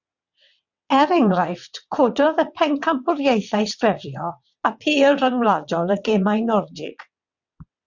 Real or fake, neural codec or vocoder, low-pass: fake; vocoder, 22.05 kHz, 80 mel bands, WaveNeXt; 7.2 kHz